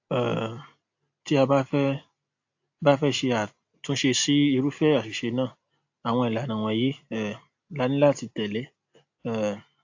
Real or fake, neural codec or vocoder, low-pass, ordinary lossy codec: real; none; 7.2 kHz; AAC, 48 kbps